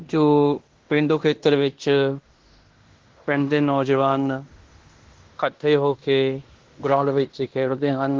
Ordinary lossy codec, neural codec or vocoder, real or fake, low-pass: Opus, 16 kbps; codec, 16 kHz in and 24 kHz out, 0.9 kbps, LongCat-Audio-Codec, fine tuned four codebook decoder; fake; 7.2 kHz